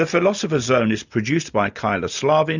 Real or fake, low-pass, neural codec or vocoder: real; 7.2 kHz; none